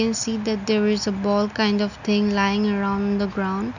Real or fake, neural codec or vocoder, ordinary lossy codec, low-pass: real; none; none; 7.2 kHz